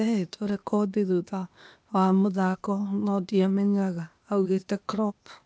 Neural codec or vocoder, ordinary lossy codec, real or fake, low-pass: codec, 16 kHz, 0.8 kbps, ZipCodec; none; fake; none